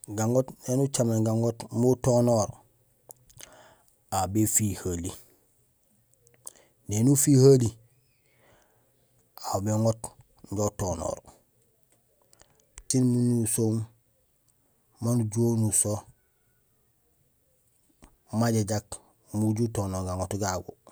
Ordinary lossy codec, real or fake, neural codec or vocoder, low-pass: none; real; none; none